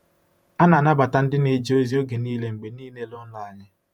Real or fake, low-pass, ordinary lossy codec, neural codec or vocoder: fake; 19.8 kHz; none; vocoder, 48 kHz, 128 mel bands, Vocos